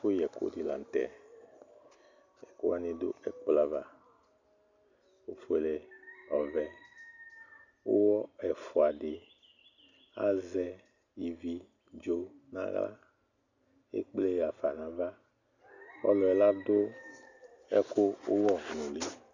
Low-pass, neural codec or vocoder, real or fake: 7.2 kHz; none; real